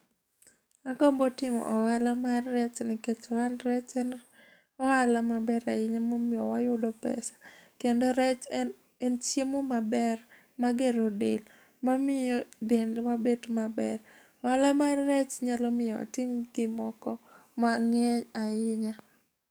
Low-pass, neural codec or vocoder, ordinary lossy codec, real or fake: none; codec, 44.1 kHz, 7.8 kbps, DAC; none; fake